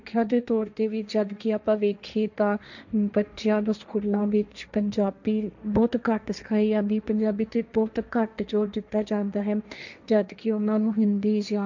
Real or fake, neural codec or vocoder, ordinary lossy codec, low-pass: fake; codec, 16 kHz, 1.1 kbps, Voila-Tokenizer; none; 7.2 kHz